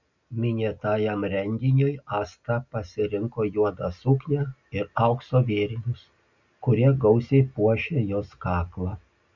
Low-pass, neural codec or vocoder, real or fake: 7.2 kHz; none; real